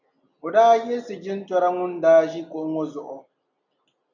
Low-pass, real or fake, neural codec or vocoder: 7.2 kHz; real; none